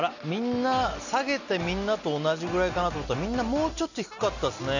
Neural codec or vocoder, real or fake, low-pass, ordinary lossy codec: none; real; 7.2 kHz; none